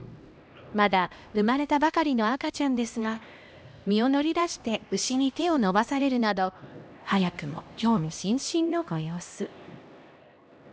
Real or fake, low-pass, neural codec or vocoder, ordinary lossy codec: fake; none; codec, 16 kHz, 1 kbps, X-Codec, HuBERT features, trained on LibriSpeech; none